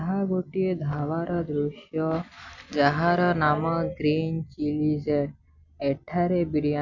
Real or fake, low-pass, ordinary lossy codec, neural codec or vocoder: real; 7.2 kHz; none; none